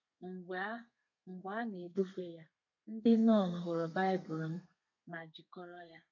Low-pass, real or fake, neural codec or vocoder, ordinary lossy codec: 7.2 kHz; fake; codec, 32 kHz, 1.9 kbps, SNAC; none